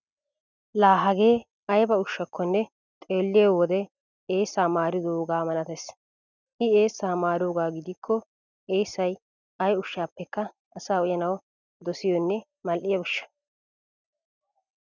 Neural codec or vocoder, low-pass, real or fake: none; 7.2 kHz; real